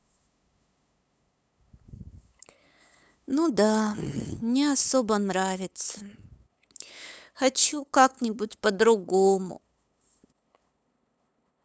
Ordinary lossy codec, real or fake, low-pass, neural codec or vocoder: none; fake; none; codec, 16 kHz, 8 kbps, FunCodec, trained on LibriTTS, 25 frames a second